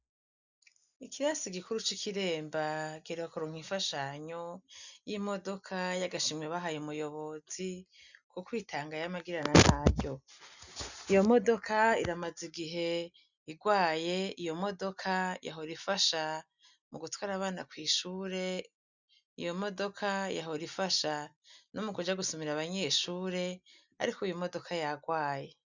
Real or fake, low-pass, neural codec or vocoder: real; 7.2 kHz; none